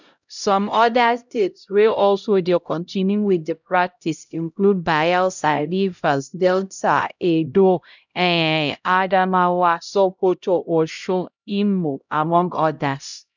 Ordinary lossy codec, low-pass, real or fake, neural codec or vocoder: none; 7.2 kHz; fake; codec, 16 kHz, 0.5 kbps, X-Codec, HuBERT features, trained on LibriSpeech